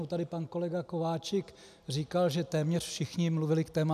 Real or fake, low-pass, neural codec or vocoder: real; 14.4 kHz; none